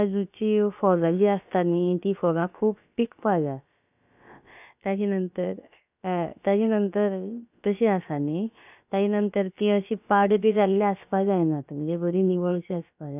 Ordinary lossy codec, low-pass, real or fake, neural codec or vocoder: none; 3.6 kHz; fake; codec, 16 kHz, about 1 kbps, DyCAST, with the encoder's durations